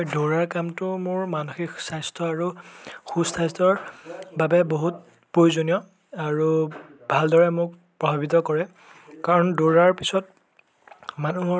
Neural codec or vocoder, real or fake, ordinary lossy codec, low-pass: none; real; none; none